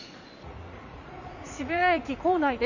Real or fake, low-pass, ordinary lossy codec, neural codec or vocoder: fake; 7.2 kHz; none; vocoder, 44.1 kHz, 80 mel bands, Vocos